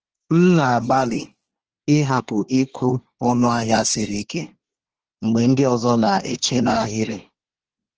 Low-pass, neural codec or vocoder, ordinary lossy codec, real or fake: 7.2 kHz; codec, 24 kHz, 1 kbps, SNAC; Opus, 16 kbps; fake